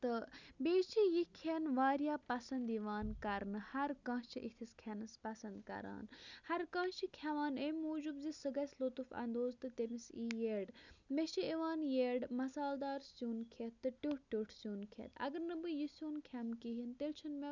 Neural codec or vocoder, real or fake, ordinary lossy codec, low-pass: none; real; none; 7.2 kHz